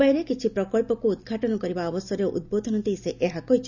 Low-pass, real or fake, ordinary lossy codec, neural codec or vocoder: 7.2 kHz; real; none; none